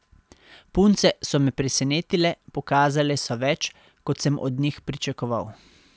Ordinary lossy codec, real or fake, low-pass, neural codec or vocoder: none; real; none; none